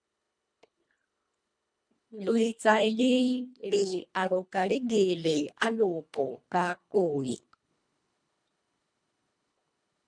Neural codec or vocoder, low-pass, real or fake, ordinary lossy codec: codec, 24 kHz, 1.5 kbps, HILCodec; 9.9 kHz; fake; MP3, 96 kbps